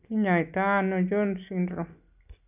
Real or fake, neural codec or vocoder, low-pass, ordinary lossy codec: real; none; 3.6 kHz; none